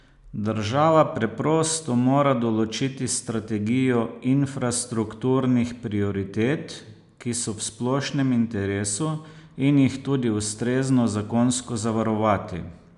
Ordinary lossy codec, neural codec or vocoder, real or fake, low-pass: none; none; real; 10.8 kHz